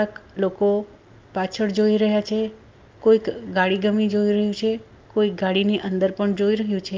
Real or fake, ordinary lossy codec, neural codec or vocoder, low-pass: real; Opus, 32 kbps; none; 7.2 kHz